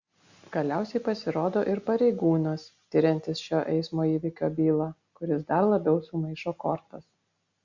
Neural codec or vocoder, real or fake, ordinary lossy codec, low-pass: none; real; Opus, 64 kbps; 7.2 kHz